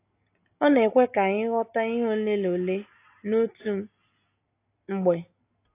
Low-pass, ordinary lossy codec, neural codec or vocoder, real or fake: 3.6 kHz; AAC, 24 kbps; none; real